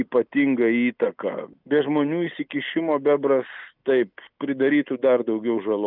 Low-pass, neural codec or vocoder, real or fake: 5.4 kHz; none; real